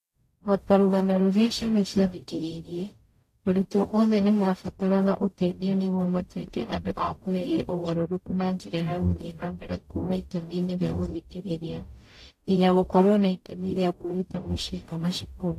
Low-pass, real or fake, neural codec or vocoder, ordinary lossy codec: 14.4 kHz; fake; codec, 44.1 kHz, 0.9 kbps, DAC; AAC, 64 kbps